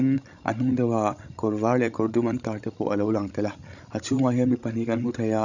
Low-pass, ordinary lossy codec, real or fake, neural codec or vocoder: 7.2 kHz; none; fake; codec, 16 kHz, 16 kbps, FreqCodec, larger model